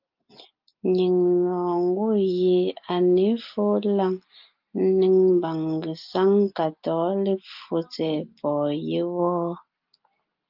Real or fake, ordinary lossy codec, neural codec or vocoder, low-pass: real; Opus, 24 kbps; none; 5.4 kHz